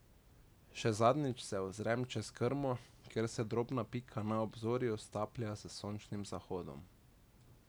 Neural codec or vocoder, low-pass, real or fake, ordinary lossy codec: none; none; real; none